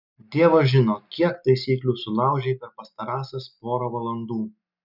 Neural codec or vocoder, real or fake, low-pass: none; real; 5.4 kHz